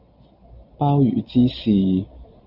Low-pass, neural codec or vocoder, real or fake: 5.4 kHz; none; real